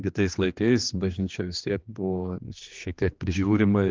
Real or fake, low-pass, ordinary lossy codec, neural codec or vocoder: fake; 7.2 kHz; Opus, 32 kbps; codec, 16 kHz, 2 kbps, X-Codec, HuBERT features, trained on general audio